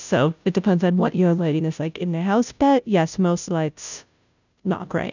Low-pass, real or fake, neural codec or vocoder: 7.2 kHz; fake; codec, 16 kHz, 0.5 kbps, FunCodec, trained on Chinese and English, 25 frames a second